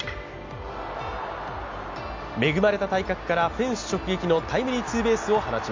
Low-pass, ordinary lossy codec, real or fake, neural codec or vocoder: 7.2 kHz; MP3, 64 kbps; real; none